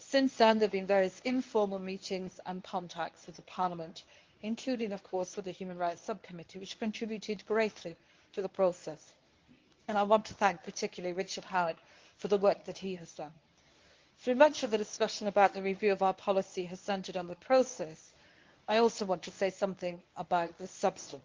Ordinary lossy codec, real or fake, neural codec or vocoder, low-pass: Opus, 32 kbps; fake; codec, 24 kHz, 0.9 kbps, WavTokenizer, medium speech release version 1; 7.2 kHz